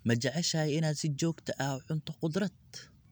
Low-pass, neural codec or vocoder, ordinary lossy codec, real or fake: none; none; none; real